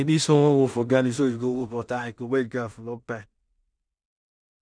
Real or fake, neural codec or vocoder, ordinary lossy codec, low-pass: fake; codec, 16 kHz in and 24 kHz out, 0.4 kbps, LongCat-Audio-Codec, two codebook decoder; none; 9.9 kHz